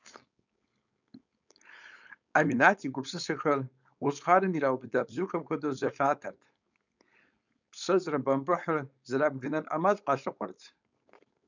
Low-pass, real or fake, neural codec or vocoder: 7.2 kHz; fake; codec, 16 kHz, 4.8 kbps, FACodec